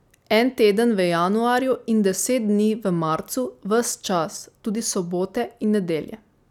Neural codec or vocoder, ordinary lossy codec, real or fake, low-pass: none; none; real; 19.8 kHz